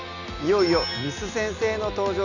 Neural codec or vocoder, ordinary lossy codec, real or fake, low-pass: none; none; real; 7.2 kHz